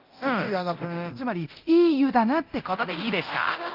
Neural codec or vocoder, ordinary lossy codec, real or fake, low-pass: codec, 24 kHz, 0.9 kbps, DualCodec; Opus, 24 kbps; fake; 5.4 kHz